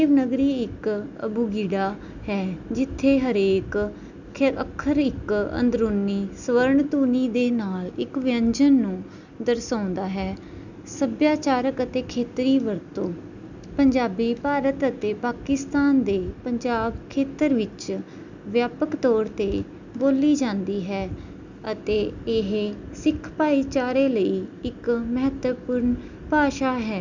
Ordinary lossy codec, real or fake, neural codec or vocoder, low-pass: none; real; none; 7.2 kHz